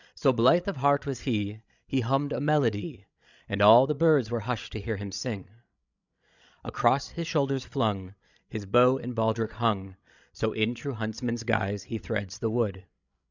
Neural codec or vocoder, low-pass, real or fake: codec, 16 kHz, 16 kbps, FreqCodec, larger model; 7.2 kHz; fake